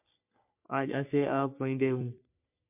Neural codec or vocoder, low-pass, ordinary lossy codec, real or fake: codec, 44.1 kHz, 3.4 kbps, Pupu-Codec; 3.6 kHz; MP3, 32 kbps; fake